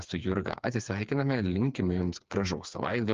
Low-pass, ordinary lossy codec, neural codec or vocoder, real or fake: 7.2 kHz; Opus, 32 kbps; codec, 16 kHz, 4 kbps, FreqCodec, smaller model; fake